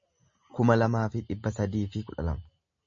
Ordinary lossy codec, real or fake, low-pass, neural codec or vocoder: MP3, 32 kbps; real; 7.2 kHz; none